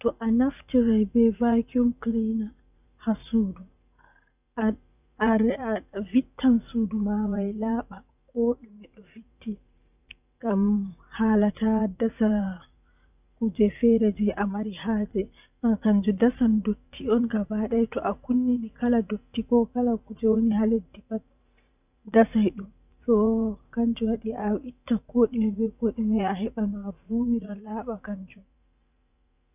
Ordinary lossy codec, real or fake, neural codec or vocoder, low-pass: none; fake; vocoder, 44.1 kHz, 80 mel bands, Vocos; 3.6 kHz